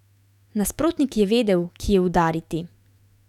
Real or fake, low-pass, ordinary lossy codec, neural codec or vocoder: fake; 19.8 kHz; none; autoencoder, 48 kHz, 128 numbers a frame, DAC-VAE, trained on Japanese speech